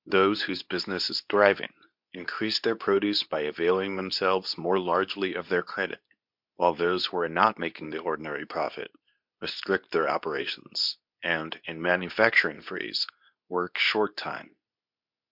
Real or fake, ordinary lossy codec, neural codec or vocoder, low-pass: fake; MP3, 48 kbps; codec, 24 kHz, 0.9 kbps, WavTokenizer, medium speech release version 2; 5.4 kHz